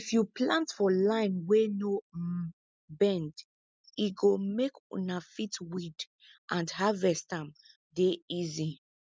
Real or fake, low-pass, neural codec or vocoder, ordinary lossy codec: real; none; none; none